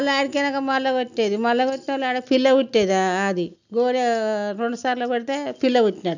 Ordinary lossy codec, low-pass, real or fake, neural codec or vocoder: none; 7.2 kHz; real; none